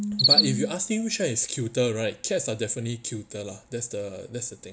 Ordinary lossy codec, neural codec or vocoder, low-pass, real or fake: none; none; none; real